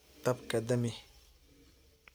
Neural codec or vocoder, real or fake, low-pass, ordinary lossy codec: none; real; none; none